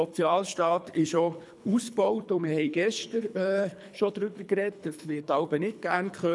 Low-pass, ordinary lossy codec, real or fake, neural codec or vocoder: none; none; fake; codec, 24 kHz, 3 kbps, HILCodec